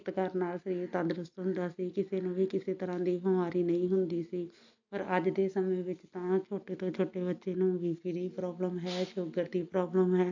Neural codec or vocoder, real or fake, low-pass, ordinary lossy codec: none; real; 7.2 kHz; AAC, 48 kbps